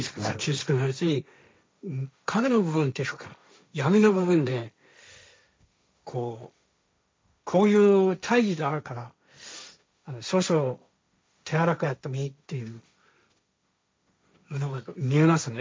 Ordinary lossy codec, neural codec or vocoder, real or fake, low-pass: none; codec, 16 kHz, 1.1 kbps, Voila-Tokenizer; fake; none